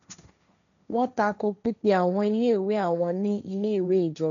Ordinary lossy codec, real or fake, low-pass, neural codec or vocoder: none; fake; 7.2 kHz; codec, 16 kHz, 1.1 kbps, Voila-Tokenizer